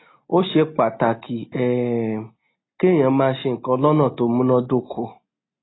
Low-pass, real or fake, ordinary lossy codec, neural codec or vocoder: 7.2 kHz; real; AAC, 16 kbps; none